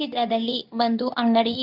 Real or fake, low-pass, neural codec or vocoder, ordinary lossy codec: fake; 5.4 kHz; codec, 24 kHz, 0.9 kbps, WavTokenizer, medium speech release version 2; none